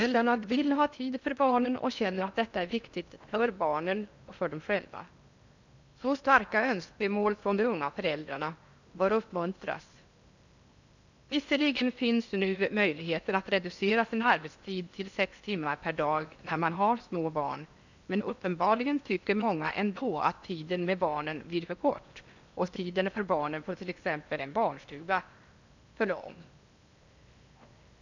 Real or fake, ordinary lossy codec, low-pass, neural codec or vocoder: fake; none; 7.2 kHz; codec, 16 kHz in and 24 kHz out, 0.8 kbps, FocalCodec, streaming, 65536 codes